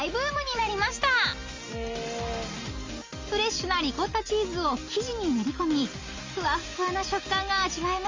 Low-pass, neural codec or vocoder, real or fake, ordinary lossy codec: 7.2 kHz; none; real; Opus, 32 kbps